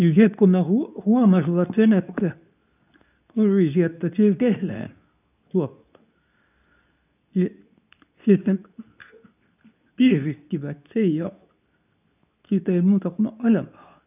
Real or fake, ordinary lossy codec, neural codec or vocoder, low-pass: fake; none; codec, 24 kHz, 0.9 kbps, WavTokenizer, medium speech release version 2; 3.6 kHz